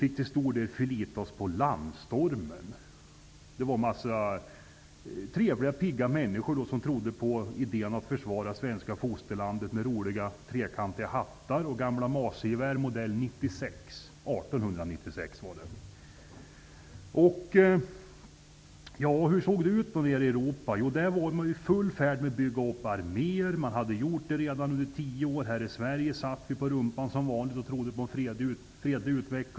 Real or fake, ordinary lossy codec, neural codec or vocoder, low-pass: real; none; none; none